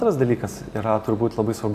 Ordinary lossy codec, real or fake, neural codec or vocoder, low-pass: AAC, 96 kbps; real; none; 14.4 kHz